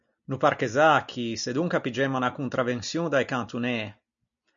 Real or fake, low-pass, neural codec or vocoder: real; 7.2 kHz; none